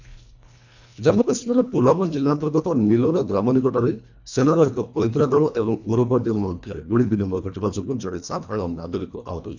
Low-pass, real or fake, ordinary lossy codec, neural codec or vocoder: 7.2 kHz; fake; MP3, 64 kbps; codec, 24 kHz, 1.5 kbps, HILCodec